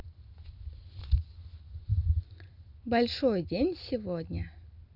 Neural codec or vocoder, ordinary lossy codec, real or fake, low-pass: none; none; real; 5.4 kHz